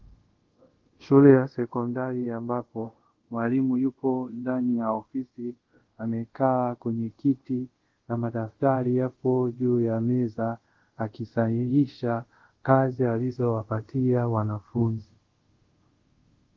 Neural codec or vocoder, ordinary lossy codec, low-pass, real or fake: codec, 24 kHz, 0.5 kbps, DualCodec; Opus, 16 kbps; 7.2 kHz; fake